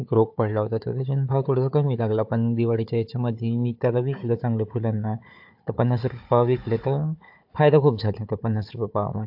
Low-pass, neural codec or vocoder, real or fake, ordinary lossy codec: 5.4 kHz; codec, 16 kHz, 4 kbps, FunCodec, trained on Chinese and English, 50 frames a second; fake; AAC, 48 kbps